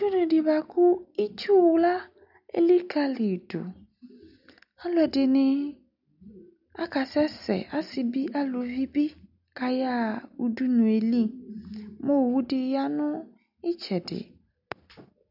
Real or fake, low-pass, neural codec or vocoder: real; 5.4 kHz; none